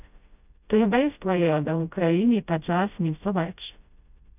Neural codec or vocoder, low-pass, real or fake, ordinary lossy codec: codec, 16 kHz, 0.5 kbps, FreqCodec, smaller model; 3.6 kHz; fake; none